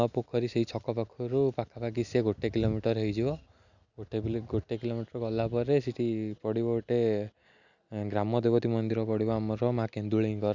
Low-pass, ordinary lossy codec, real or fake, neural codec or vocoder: 7.2 kHz; none; real; none